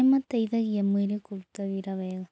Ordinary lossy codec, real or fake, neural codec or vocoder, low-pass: none; real; none; none